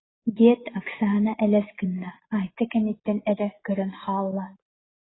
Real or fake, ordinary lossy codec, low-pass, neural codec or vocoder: real; AAC, 16 kbps; 7.2 kHz; none